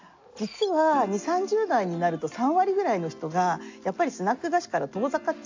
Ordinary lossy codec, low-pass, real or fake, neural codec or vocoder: AAC, 48 kbps; 7.2 kHz; real; none